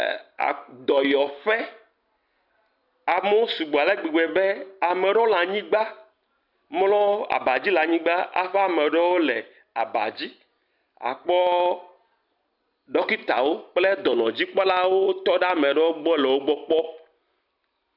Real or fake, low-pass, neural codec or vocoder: real; 5.4 kHz; none